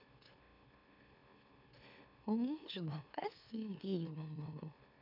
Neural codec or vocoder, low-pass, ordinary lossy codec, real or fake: autoencoder, 44.1 kHz, a latent of 192 numbers a frame, MeloTTS; 5.4 kHz; none; fake